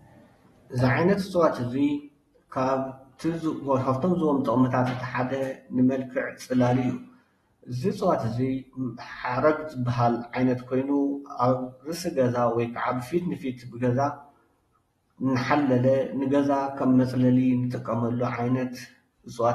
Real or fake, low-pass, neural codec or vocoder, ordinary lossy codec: real; 19.8 kHz; none; AAC, 32 kbps